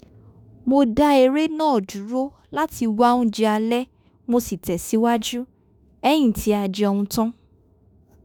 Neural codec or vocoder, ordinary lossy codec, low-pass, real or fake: autoencoder, 48 kHz, 32 numbers a frame, DAC-VAE, trained on Japanese speech; none; none; fake